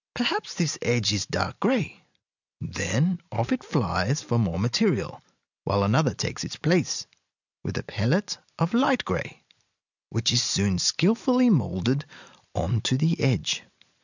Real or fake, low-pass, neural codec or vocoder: real; 7.2 kHz; none